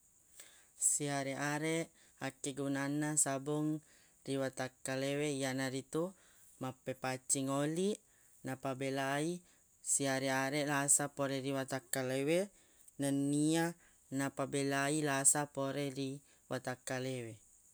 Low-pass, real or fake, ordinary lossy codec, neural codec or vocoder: none; real; none; none